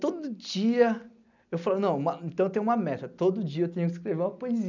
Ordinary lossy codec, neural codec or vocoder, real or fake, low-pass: none; none; real; 7.2 kHz